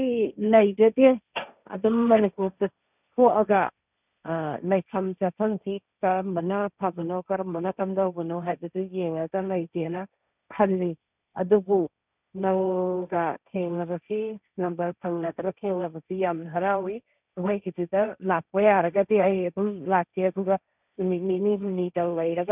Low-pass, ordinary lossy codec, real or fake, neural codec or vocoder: 3.6 kHz; none; fake; codec, 16 kHz, 1.1 kbps, Voila-Tokenizer